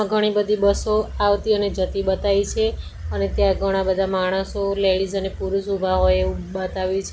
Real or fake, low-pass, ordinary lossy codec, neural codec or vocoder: real; none; none; none